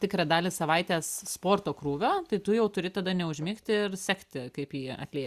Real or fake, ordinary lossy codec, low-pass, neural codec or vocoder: real; Opus, 64 kbps; 14.4 kHz; none